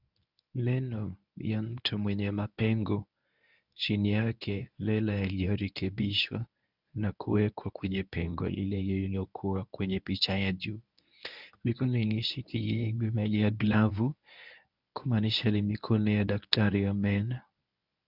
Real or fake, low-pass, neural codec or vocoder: fake; 5.4 kHz; codec, 24 kHz, 0.9 kbps, WavTokenizer, medium speech release version 1